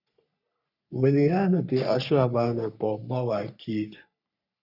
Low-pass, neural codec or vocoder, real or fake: 5.4 kHz; codec, 44.1 kHz, 3.4 kbps, Pupu-Codec; fake